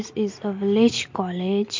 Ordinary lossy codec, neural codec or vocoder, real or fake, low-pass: MP3, 48 kbps; none; real; 7.2 kHz